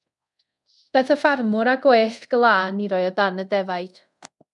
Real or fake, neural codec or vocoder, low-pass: fake; codec, 24 kHz, 0.5 kbps, DualCodec; 10.8 kHz